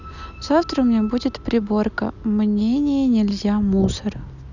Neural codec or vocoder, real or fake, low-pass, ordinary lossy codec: none; real; 7.2 kHz; none